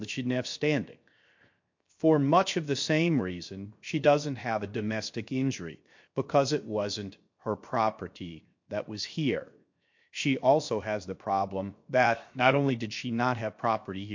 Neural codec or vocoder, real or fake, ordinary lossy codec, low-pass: codec, 16 kHz, 0.7 kbps, FocalCodec; fake; MP3, 48 kbps; 7.2 kHz